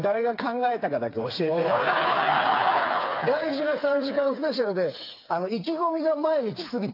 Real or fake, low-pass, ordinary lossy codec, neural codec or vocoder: fake; 5.4 kHz; AAC, 32 kbps; codec, 16 kHz, 4 kbps, FreqCodec, smaller model